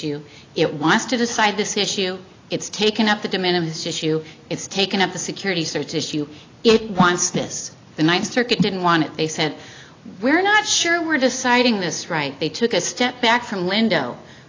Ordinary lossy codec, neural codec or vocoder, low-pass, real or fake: AAC, 32 kbps; none; 7.2 kHz; real